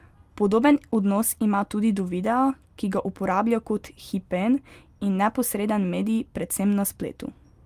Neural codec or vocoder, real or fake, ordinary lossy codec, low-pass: none; real; Opus, 32 kbps; 14.4 kHz